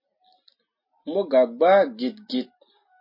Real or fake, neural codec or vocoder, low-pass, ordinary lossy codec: real; none; 5.4 kHz; MP3, 24 kbps